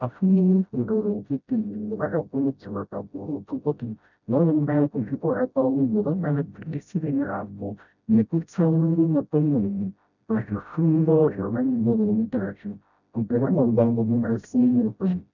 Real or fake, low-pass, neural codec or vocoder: fake; 7.2 kHz; codec, 16 kHz, 0.5 kbps, FreqCodec, smaller model